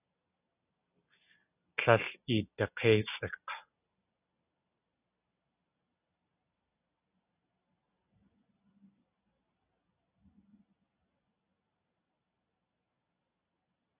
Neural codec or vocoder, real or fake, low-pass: none; real; 3.6 kHz